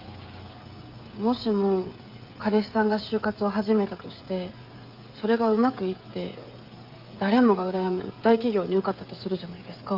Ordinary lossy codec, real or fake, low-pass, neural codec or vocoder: Opus, 32 kbps; fake; 5.4 kHz; codec, 16 kHz, 16 kbps, FreqCodec, smaller model